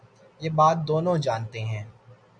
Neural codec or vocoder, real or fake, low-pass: none; real; 9.9 kHz